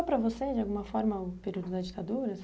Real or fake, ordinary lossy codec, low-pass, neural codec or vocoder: real; none; none; none